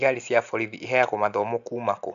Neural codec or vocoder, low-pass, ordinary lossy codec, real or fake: none; 7.2 kHz; none; real